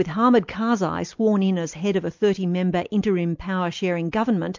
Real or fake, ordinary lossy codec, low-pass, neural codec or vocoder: real; MP3, 64 kbps; 7.2 kHz; none